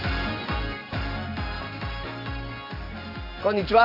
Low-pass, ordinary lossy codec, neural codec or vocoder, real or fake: 5.4 kHz; none; none; real